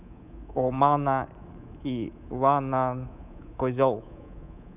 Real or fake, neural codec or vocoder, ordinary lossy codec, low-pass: fake; codec, 24 kHz, 3.1 kbps, DualCodec; none; 3.6 kHz